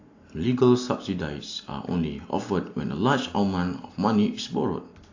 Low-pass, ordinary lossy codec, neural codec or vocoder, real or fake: 7.2 kHz; AAC, 48 kbps; none; real